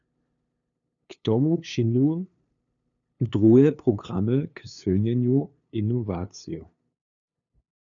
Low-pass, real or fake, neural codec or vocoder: 7.2 kHz; fake; codec, 16 kHz, 2 kbps, FunCodec, trained on LibriTTS, 25 frames a second